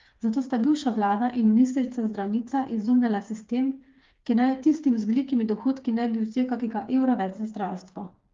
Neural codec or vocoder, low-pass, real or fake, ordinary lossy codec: codec, 16 kHz, 4 kbps, FreqCodec, smaller model; 7.2 kHz; fake; Opus, 32 kbps